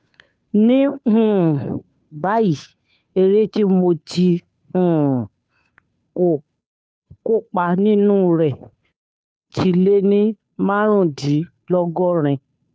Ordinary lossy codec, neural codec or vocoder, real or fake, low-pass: none; codec, 16 kHz, 2 kbps, FunCodec, trained on Chinese and English, 25 frames a second; fake; none